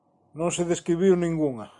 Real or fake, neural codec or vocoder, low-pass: real; none; 10.8 kHz